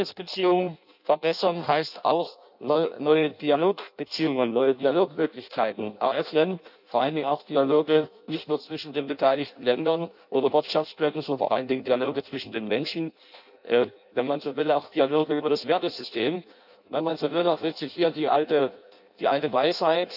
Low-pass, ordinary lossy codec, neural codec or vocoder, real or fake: 5.4 kHz; none; codec, 16 kHz in and 24 kHz out, 0.6 kbps, FireRedTTS-2 codec; fake